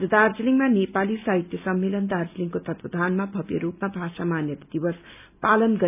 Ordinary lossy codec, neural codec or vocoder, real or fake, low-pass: none; none; real; 3.6 kHz